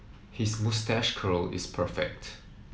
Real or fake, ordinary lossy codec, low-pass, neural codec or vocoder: real; none; none; none